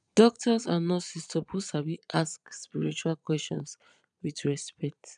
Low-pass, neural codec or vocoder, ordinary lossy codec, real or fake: 9.9 kHz; vocoder, 22.05 kHz, 80 mel bands, Vocos; none; fake